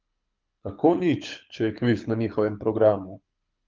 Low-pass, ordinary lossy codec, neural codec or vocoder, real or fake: 7.2 kHz; Opus, 24 kbps; codec, 24 kHz, 6 kbps, HILCodec; fake